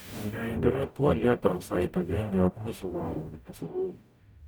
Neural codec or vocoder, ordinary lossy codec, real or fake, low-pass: codec, 44.1 kHz, 0.9 kbps, DAC; none; fake; none